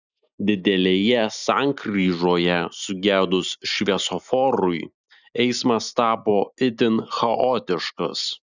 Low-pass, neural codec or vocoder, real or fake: 7.2 kHz; none; real